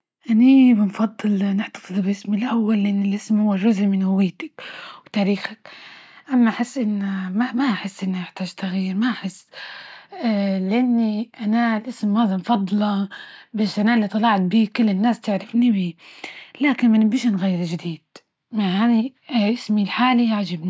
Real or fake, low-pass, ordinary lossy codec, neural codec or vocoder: real; none; none; none